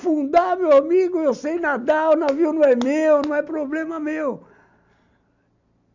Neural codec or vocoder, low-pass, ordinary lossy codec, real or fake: none; 7.2 kHz; none; real